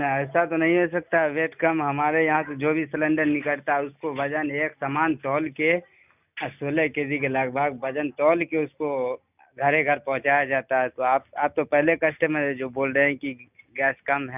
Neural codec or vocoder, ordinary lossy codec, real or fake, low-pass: none; none; real; 3.6 kHz